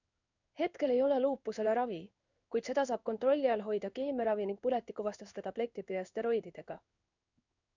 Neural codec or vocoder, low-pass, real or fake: codec, 16 kHz in and 24 kHz out, 1 kbps, XY-Tokenizer; 7.2 kHz; fake